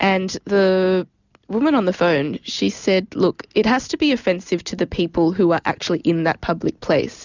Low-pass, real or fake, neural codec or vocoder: 7.2 kHz; real; none